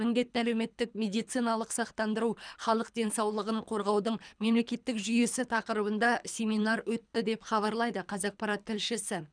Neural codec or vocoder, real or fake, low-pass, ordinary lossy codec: codec, 24 kHz, 3 kbps, HILCodec; fake; 9.9 kHz; none